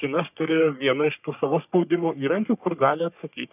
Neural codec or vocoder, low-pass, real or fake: codec, 44.1 kHz, 3.4 kbps, Pupu-Codec; 3.6 kHz; fake